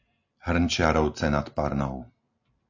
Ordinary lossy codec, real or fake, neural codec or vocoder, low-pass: AAC, 48 kbps; real; none; 7.2 kHz